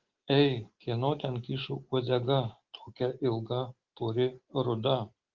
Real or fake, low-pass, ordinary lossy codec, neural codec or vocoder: real; 7.2 kHz; Opus, 16 kbps; none